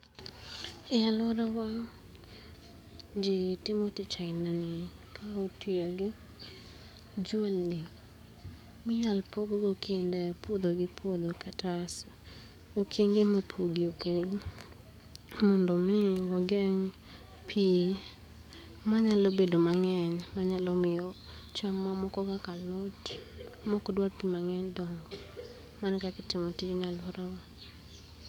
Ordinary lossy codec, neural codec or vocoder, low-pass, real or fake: none; codec, 44.1 kHz, 7.8 kbps, DAC; 19.8 kHz; fake